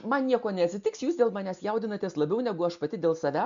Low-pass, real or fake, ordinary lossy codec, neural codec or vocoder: 7.2 kHz; real; MP3, 64 kbps; none